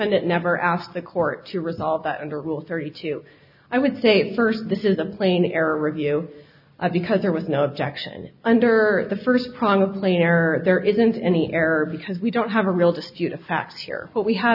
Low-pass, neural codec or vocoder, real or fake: 5.4 kHz; none; real